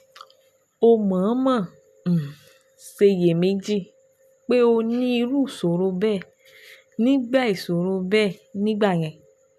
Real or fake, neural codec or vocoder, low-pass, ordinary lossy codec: real; none; 14.4 kHz; none